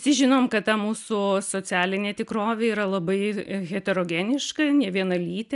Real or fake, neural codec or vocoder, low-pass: real; none; 10.8 kHz